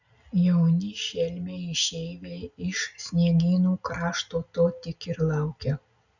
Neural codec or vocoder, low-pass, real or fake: none; 7.2 kHz; real